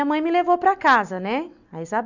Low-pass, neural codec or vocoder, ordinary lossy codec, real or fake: 7.2 kHz; none; none; real